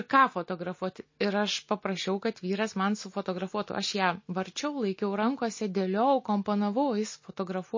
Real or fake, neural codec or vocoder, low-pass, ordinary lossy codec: real; none; 7.2 kHz; MP3, 32 kbps